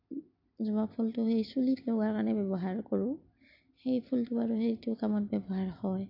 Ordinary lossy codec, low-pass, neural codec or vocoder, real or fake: AAC, 32 kbps; 5.4 kHz; none; real